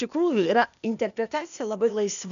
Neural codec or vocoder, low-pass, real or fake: codec, 16 kHz, 0.8 kbps, ZipCodec; 7.2 kHz; fake